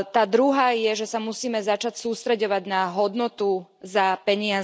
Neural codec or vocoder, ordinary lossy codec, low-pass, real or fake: none; none; none; real